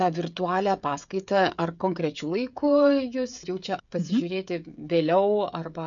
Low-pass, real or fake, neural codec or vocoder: 7.2 kHz; fake; codec, 16 kHz, 16 kbps, FreqCodec, smaller model